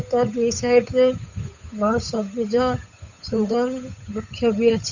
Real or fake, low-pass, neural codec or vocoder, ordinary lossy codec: fake; 7.2 kHz; codec, 16 kHz, 8 kbps, FunCodec, trained on Chinese and English, 25 frames a second; none